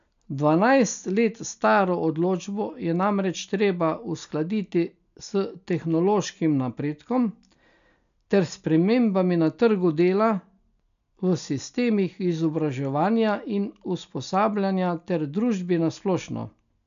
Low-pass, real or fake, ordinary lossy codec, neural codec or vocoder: 7.2 kHz; real; none; none